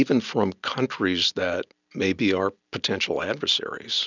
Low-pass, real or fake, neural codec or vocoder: 7.2 kHz; real; none